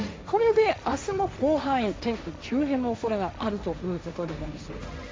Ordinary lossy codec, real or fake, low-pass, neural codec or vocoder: none; fake; none; codec, 16 kHz, 1.1 kbps, Voila-Tokenizer